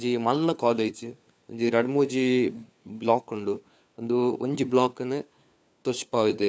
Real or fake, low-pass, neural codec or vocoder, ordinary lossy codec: fake; none; codec, 16 kHz, 4 kbps, FunCodec, trained on LibriTTS, 50 frames a second; none